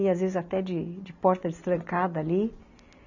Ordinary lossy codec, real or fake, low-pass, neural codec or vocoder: none; real; 7.2 kHz; none